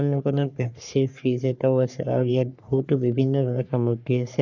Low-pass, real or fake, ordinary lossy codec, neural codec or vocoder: 7.2 kHz; fake; none; codec, 44.1 kHz, 3.4 kbps, Pupu-Codec